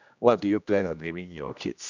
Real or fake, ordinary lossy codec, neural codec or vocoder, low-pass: fake; none; codec, 16 kHz, 1 kbps, X-Codec, HuBERT features, trained on general audio; 7.2 kHz